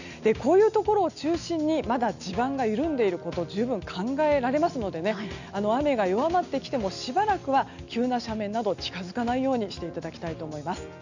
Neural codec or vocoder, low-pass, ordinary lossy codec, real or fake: none; 7.2 kHz; none; real